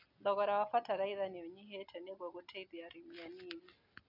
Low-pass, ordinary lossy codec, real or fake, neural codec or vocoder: 5.4 kHz; none; real; none